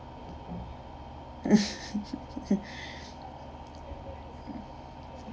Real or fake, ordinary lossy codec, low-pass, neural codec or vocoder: real; none; none; none